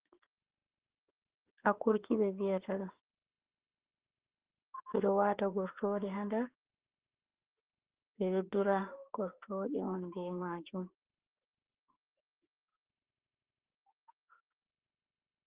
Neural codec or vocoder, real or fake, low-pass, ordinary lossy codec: autoencoder, 48 kHz, 32 numbers a frame, DAC-VAE, trained on Japanese speech; fake; 3.6 kHz; Opus, 16 kbps